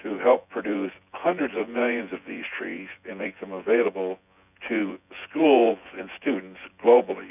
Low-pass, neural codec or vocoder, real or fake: 3.6 kHz; vocoder, 24 kHz, 100 mel bands, Vocos; fake